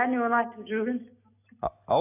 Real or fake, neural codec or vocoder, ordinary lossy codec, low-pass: real; none; none; 3.6 kHz